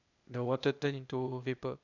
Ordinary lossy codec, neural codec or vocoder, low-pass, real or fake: none; codec, 16 kHz, 0.8 kbps, ZipCodec; 7.2 kHz; fake